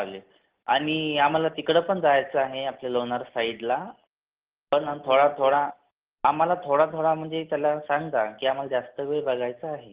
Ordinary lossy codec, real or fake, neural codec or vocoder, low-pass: Opus, 16 kbps; real; none; 3.6 kHz